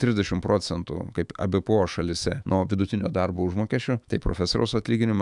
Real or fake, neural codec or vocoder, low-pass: fake; autoencoder, 48 kHz, 128 numbers a frame, DAC-VAE, trained on Japanese speech; 10.8 kHz